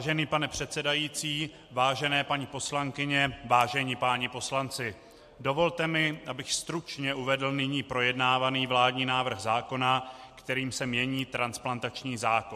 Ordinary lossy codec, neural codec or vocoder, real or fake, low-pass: MP3, 64 kbps; none; real; 14.4 kHz